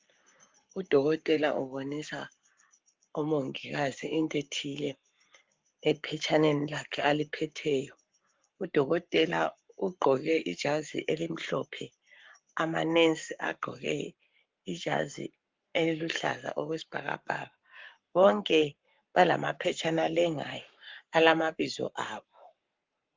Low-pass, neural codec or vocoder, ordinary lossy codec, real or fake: 7.2 kHz; codec, 44.1 kHz, 7.8 kbps, Pupu-Codec; Opus, 32 kbps; fake